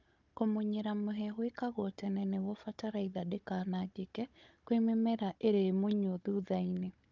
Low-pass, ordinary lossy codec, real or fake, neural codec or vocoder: 7.2 kHz; Opus, 24 kbps; fake; codec, 16 kHz, 16 kbps, FunCodec, trained on Chinese and English, 50 frames a second